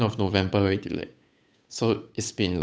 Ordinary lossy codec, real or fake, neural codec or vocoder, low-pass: none; fake; codec, 16 kHz, 8 kbps, FunCodec, trained on Chinese and English, 25 frames a second; none